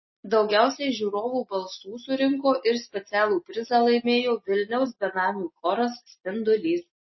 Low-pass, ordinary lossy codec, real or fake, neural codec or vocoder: 7.2 kHz; MP3, 24 kbps; real; none